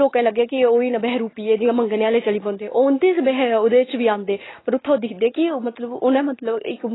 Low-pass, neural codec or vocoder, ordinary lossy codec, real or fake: 7.2 kHz; none; AAC, 16 kbps; real